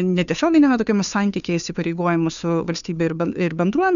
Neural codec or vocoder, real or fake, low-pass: codec, 16 kHz, 2 kbps, FunCodec, trained on Chinese and English, 25 frames a second; fake; 7.2 kHz